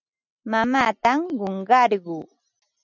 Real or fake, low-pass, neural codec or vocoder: real; 7.2 kHz; none